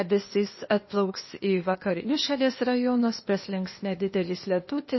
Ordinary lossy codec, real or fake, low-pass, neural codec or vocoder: MP3, 24 kbps; fake; 7.2 kHz; codec, 16 kHz, 0.8 kbps, ZipCodec